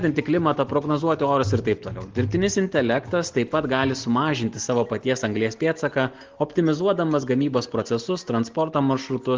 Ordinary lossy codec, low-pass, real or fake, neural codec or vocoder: Opus, 16 kbps; 7.2 kHz; real; none